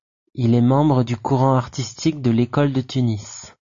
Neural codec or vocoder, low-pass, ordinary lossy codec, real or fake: none; 7.2 kHz; MP3, 64 kbps; real